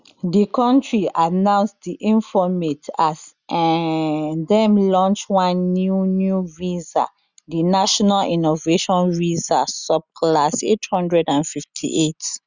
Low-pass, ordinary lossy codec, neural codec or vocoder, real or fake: 7.2 kHz; Opus, 64 kbps; none; real